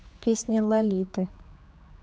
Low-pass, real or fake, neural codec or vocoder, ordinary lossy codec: none; fake; codec, 16 kHz, 4 kbps, X-Codec, HuBERT features, trained on general audio; none